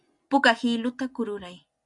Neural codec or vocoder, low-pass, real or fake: none; 10.8 kHz; real